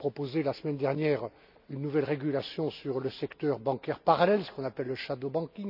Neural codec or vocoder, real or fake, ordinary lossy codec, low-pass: none; real; none; 5.4 kHz